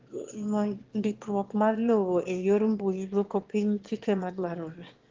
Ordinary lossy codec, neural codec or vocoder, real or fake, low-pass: Opus, 16 kbps; autoencoder, 22.05 kHz, a latent of 192 numbers a frame, VITS, trained on one speaker; fake; 7.2 kHz